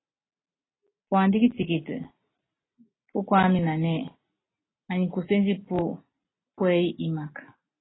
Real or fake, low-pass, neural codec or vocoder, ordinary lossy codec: real; 7.2 kHz; none; AAC, 16 kbps